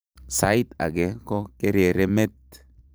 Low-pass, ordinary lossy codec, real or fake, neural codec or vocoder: none; none; fake; vocoder, 44.1 kHz, 128 mel bands every 512 samples, BigVGAN v2